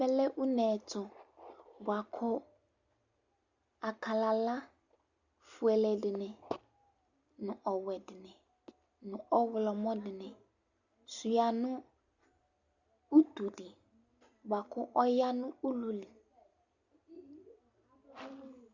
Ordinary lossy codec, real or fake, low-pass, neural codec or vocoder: Opus, 64 kbps; real; 7.2 kHz; none